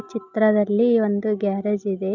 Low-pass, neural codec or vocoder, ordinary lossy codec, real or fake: 7.2 kHz; none; none; real